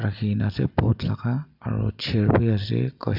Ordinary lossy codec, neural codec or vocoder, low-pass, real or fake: none; none; 5.4 kHz; real